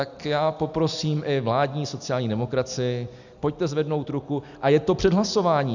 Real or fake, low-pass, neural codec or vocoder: real; 7.2 kHz; none